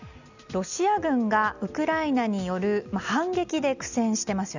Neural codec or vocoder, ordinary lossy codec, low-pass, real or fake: none; none; 7.2 kHz; real